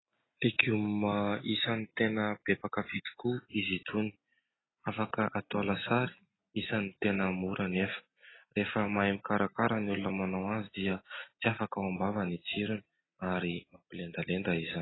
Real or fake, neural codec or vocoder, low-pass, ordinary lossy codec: real; none; 7.2 kHz; AAC, 16 kbps